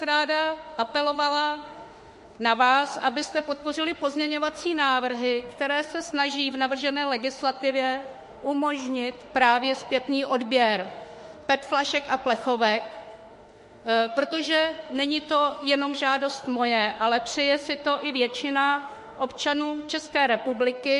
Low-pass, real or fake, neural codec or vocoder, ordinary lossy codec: 14.4 kHz; fake; autoencoder, 48 kHz, 32 numbers a frame, DAC-VAE, trained on Japanese speech; MP3, 48 kbps